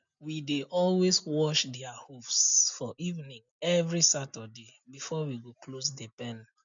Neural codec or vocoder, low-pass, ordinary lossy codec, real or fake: none; 7.2 kHz; none; real